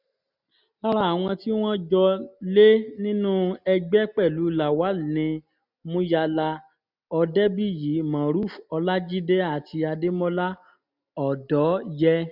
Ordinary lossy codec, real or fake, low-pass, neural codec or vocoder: none; real; 5.4 kHz; none